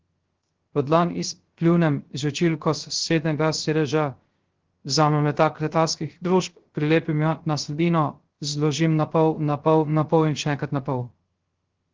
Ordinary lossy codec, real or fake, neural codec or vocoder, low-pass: Opus, 16 kbps; fake; codec, 16 kHz, 0.3 kbps, FocalCodec; 7.2 kHz